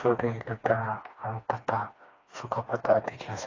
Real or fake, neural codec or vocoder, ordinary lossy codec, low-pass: fake; codec, 16 kHz, 2 kbps, FreqCodec, smaller model; AAC, 32 kbps; 7.2 kHz